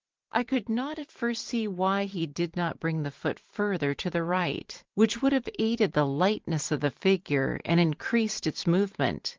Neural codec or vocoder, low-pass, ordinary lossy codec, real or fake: vocoder, 44.1 kHz, 128 mel bands every 512 samples, BigVGAN v2; 7.2 kHz; Opus, 16 kbps; fake